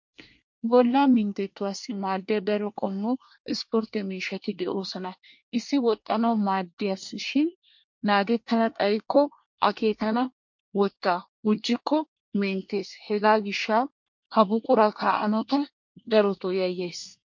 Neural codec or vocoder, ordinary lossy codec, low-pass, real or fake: codec, 24 kHz, 1 kbps, SNAC; MP3, 48 kbps; 7.2 kHz; fake